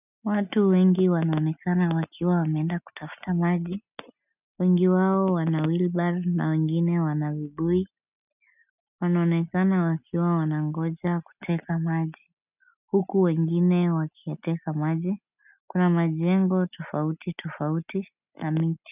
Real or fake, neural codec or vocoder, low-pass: real; none; 3.6 kHz